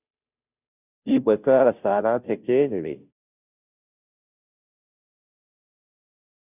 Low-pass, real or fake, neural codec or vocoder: 3.6 kHz; fake; codec, 16 kHz, 0.5 kbps, FunCodec, trained on Chinese and English, 25 frames a second